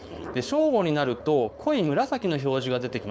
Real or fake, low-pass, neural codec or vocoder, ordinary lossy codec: fake; none; codec, 16 kHz, 4.8 kbps, FACodec; none